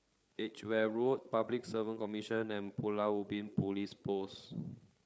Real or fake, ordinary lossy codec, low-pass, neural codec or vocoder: fake; none; none; codec, 16 kHz, 8 kbps, FreqCodec, larger model